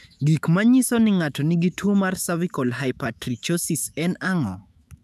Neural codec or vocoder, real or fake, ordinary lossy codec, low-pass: autoencoder, 48 kHz, 128 numbers a frame, DAC-VAE, trained on Japanese speech; fake; none; 14.4 kHz